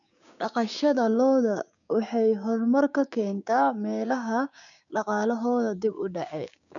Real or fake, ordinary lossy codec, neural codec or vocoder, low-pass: fake; none; codec, 16 kHz, 6 kbps, DAC; 7.2 kHz